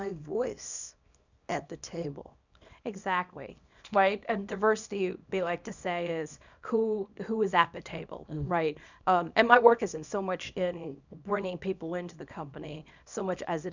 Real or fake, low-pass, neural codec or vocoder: fake; 7.2 kHz; codec, 24 kHz, 0.9 kbps, WavTokenizer, small release